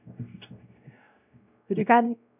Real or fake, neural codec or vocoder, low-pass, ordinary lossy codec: fake; codec, 16 kHz, 0.5 kbps, X-Codec, WavLM features, trained on Multilingual LibriSpeech; 3.6 kHz; none